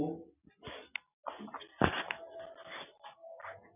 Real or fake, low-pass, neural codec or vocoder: real; 3.6 kHz; none